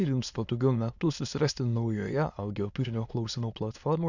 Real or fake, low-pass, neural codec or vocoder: fake; 7.2 kHz; autoencoder, 22.05 kHz, a latent of 192 numbers a frame, VITS, trained on many speakers